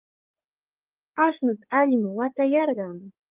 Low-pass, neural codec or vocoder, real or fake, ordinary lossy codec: 3.6 kHz; codec, 16 kHz in and 24 kHz out, 2.2 kbps, FireRedTTS-2 codec; fake; Opus, 32 kbps